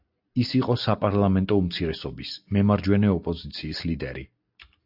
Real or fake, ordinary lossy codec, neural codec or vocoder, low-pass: real; AAC, 48 kbps; none; 5.4 kHz